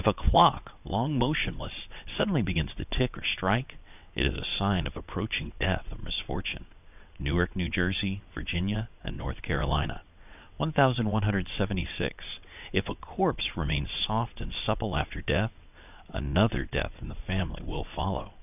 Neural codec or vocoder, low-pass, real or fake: vocoder, 22.05 kHz, 80 mel bands, WaveNeXt; 3.6 kHz; fake